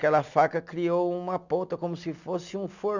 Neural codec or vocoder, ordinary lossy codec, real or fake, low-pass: none; none; real; 7.2 kHz